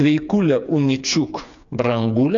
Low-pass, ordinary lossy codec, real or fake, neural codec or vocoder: 7.2 kHz; AAC, 64 kbps; fake; codec, 16 kHz, 4 kbps, FreqCodec, smaller model